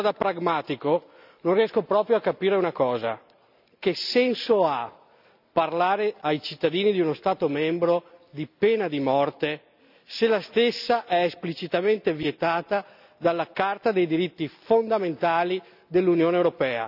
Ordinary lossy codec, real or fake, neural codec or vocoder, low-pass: none; real; none; 5.4 kHz